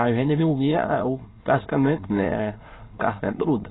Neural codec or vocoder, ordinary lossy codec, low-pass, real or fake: autoencoder, 22.05 kHz, a latent of 192 numbers a frame, VITS, trained on many speakers; AAC, 16 kbps; 7.2 kHz; fake